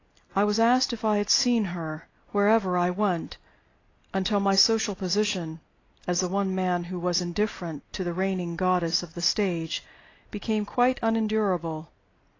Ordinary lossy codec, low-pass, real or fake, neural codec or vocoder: AAC, 32 kbps; 7.2 kHz; real; none